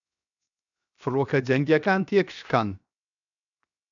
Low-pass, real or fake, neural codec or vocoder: 7.2 kHz; fake; codec, 16 kHz, 0.7 kbps, FocalCodec